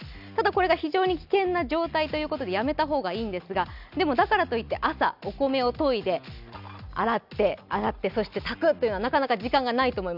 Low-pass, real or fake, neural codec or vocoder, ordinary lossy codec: 5.4 kHz; real; none; none